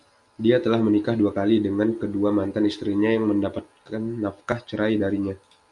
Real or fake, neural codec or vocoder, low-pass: real; none; 10.8 kHz